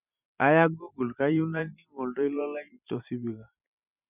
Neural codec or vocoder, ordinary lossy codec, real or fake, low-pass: vocoder, 44.1 kHz, 128 mel bands every 512 samples, BigVGAN v2; none; fake; 3.6 kHz